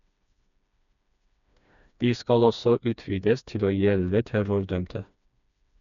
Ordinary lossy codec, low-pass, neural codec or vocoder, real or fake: MP3, 96 kbps; 7.2 kHz; codec, 16 kHz, 2 kbps, FreqCodec, smaller model; fake